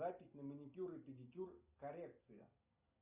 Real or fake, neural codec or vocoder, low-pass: real; none; 3.6 kHz